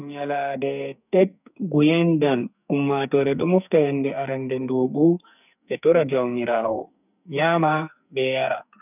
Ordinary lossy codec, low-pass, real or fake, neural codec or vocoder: none; 3.6 kHz; fake; codec, 44.1 kHz, 2.6 kbps, SNAC